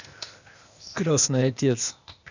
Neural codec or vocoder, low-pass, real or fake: codec, 16 kHz, 0.8 kbps, ZipCodec; 7.2 kHz; fake